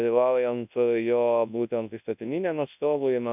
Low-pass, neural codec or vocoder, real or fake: 3.6 kHz; codec, 24 kHz, 0.9 kbps, WavTokenizer, large speech release; fake